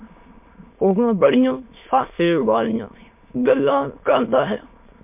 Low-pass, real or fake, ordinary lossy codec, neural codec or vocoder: 3.6 kHz; fake; MP3, 32 kbps; autoencoder, 22.05 kHz, a latent of 192 numbers a frame, VITS, trained on many speakers